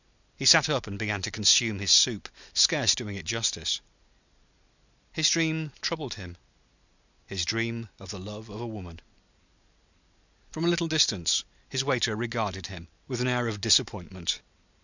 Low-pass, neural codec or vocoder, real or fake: 7.2 kHz; none; real